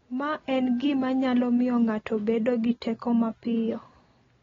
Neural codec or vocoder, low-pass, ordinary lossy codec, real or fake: none; 7.2 kHz; AAC, 24 kbps; real